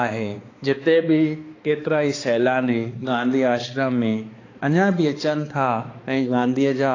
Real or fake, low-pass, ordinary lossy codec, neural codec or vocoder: fake; 7.2 kHz; AAC, 32 kbps; codec, 16 kHz, 2 kbps, X-Codec, HuBERT features, trained on balanced general audio